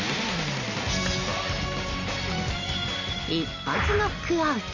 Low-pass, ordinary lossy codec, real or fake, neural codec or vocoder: 7.2 kHz; none; real; none